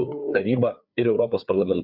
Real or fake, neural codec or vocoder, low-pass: fake; codec, 16 kHz, 4 kbps, FreqCodec, larger model; 5.4 kHz